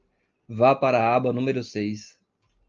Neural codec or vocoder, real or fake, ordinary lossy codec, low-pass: none; real; Opus, 24 kbps; 7.2 kHz